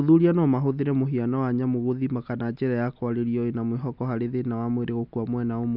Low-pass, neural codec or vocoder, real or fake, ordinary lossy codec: 5.4 kHz; none; real; none